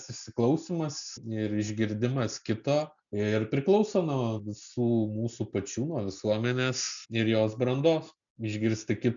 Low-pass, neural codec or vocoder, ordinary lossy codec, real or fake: 7.2 kHz; none; MP3, 96 kbps; real